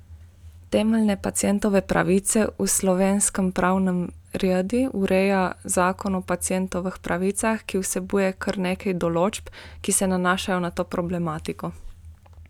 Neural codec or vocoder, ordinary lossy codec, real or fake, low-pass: none; none; real; 19.8 kHz